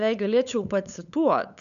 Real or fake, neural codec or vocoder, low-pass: fake; codec, 16 kHz, 8 kbps, FunCodec, trained on LibriTTS, 25 frames a second; 7.2 kHz